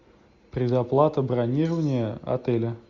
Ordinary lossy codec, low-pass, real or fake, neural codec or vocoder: Opus, 64 kbps; 7.2 kHz; real; none